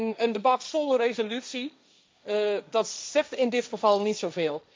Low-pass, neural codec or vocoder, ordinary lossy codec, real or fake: none; codec, 16 kHz, 1.1 kbps, Voila-Tokenizer; none; fake